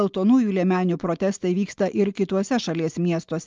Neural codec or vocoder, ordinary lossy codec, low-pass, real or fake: none; Opus, 32 kbps; 7.2 kHz; real